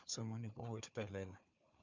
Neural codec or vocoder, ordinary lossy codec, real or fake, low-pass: codec, 16 kHz, 4 kbps, FunCodec, trained on Chinese and English, 50 frames a second; MP3, 64 kbps; fake; 7.2 kHz